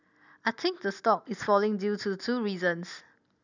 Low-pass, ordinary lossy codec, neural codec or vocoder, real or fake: 7.2 kHz; none; none; real